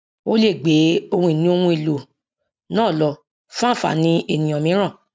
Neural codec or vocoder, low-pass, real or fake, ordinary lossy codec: none; none; real; none